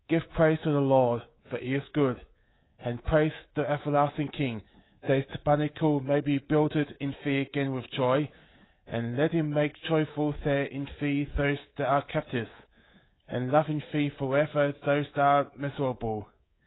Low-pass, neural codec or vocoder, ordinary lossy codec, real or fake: 7.2 kHz; codec, 24 kHz, 3.1 kbps, DualCodec; AAC, 16 kbps; fake